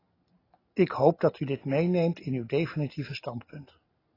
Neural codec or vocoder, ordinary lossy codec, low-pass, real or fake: none; AAC, 24 kbps; 5.4 kHz; real